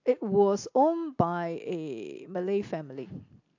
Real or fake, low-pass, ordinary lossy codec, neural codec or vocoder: real; 7.2 kHz; AAC, 48 kbps; none